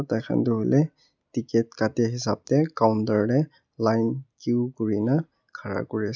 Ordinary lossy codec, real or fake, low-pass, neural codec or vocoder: none; real; 7.2 kHz; none